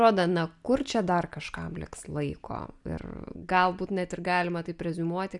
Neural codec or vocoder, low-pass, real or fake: none; 10.8 kHz; real